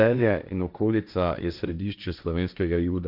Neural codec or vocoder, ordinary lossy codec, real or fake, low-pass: codec, 16 kHz, 1.1 kbps, Voila-Tokenizer; none; fake; 5.4 kHz